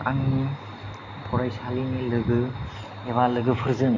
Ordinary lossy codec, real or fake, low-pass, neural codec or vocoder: none; real; 7.2 kHz; none